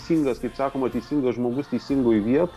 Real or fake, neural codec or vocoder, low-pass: real; none; 14.4 kHz